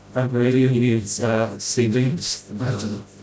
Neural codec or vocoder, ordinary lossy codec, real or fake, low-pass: codec, 16 kHz, 0.5 kbps, FreqCodec, smaller model; none; fake; none